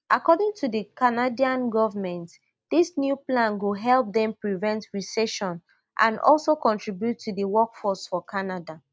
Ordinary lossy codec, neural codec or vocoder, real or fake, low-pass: none; none; real; none